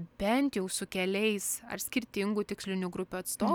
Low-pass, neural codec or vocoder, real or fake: 19.8 kHz; none; real